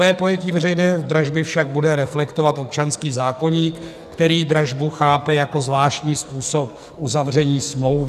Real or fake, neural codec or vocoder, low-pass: fake; codec, 44.1 kHz, 2.6 kbps, SNAC; 14.4 kHz